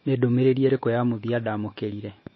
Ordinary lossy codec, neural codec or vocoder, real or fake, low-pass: MP3, 24 kbps; autoencoder, 48 kHz, 128 numbers a frame, DAC-VAE, trained on Japanese speech; fake; 7.2 kHz